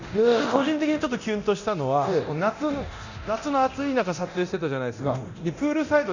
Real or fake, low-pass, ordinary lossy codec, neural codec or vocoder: fake; 7.2 kHz; none; codec, 24 kHz, 0.9 kbps, DualCodec